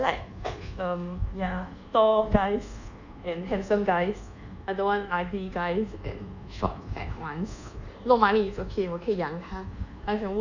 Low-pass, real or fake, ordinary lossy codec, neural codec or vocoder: 7.2 kHz; fake; none; codec, 24 kHz, 1.2 kbps, DualCodec